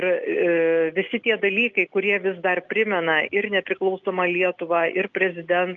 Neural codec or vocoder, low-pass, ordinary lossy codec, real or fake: none; 7.2 kHz; Opus, 24 kbps; real